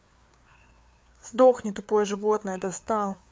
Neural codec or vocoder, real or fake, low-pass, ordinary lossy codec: codec, 16 kHz, 4 kbps, FreqCodec, larger model; fake; none; none